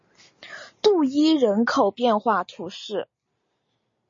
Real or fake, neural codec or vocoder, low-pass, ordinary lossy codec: real; none; 7.2 kHz; MP3, 32 kbps